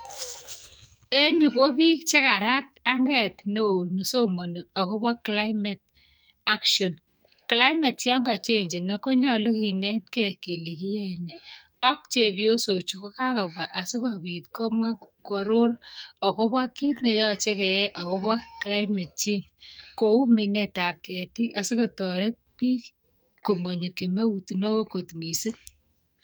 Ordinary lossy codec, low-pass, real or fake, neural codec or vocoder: none; none; fake; codec, 44.1 kHz, 2.6 kbps, SNAC